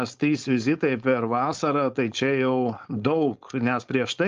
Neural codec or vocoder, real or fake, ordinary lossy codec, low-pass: codec, 16 kHz, 4.8 kbps, FACodec; fake; Opus, 24 kbps; 7.2 kHz